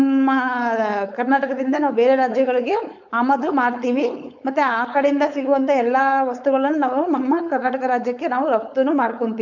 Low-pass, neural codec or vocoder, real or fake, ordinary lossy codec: 7.2 kHz; codec, 16 kHz, 4.8 kbps, FACodec; fake; none